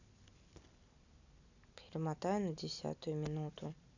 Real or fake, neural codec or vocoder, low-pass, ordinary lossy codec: real; none; 7.2 kHz; none